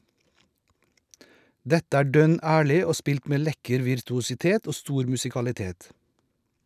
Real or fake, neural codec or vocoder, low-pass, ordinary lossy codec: real; none; 14.4 kHz; none